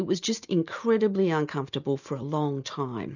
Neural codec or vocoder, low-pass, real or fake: none; 7.2 kHz; real